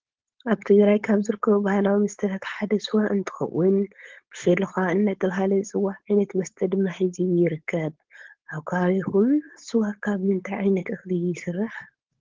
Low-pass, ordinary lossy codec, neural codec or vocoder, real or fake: 7.2 kHz; Opus, 16 kbps; codec, 16 kHz, 4.8 kbps, FACodec; fake